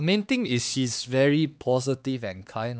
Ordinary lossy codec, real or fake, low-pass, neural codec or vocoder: none; fake; none; codec, 16 kHz, 2 kbps, X-Codec, HuBERT features, trained on LibriSpeech